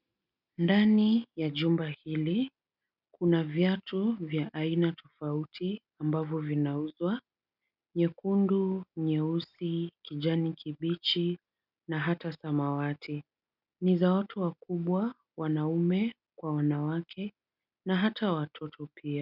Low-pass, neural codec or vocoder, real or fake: 5.4 kHz; none; real